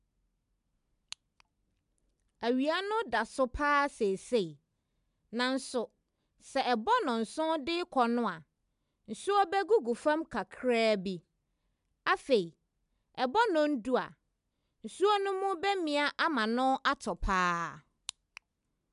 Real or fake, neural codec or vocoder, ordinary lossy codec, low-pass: real; none; MP3, 96 kbps; 10.8 kHz